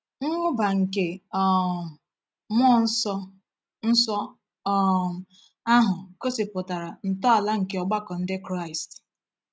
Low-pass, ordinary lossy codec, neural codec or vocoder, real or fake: none; none; none; real